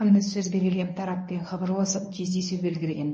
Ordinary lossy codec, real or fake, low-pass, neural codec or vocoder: MP3, 32 kbps; fake; 9.9 kHz; codec, 24 kHz, 0.9 kbps, WavTokenizer, medium speech release version 1